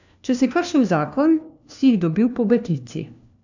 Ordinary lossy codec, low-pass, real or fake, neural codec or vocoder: none; 7.2 kHz; fake; codec, 16 kHz, 1 kbps, FunCodec, trained on LibriTTS, 50 frames a second